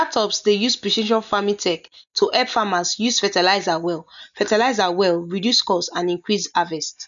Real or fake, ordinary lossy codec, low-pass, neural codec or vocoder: real; none; 7.2 kHz; none